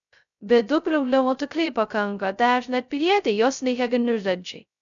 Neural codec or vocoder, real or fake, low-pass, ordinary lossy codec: codec, 16 kHz, 0.2 kbps, FocalCodec; fake; 7.2 kHz; MP3, 96 kbps